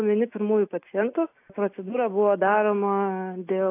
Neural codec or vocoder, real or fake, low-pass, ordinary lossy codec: none; real; 3.6 kHz; AAC, 24 kbps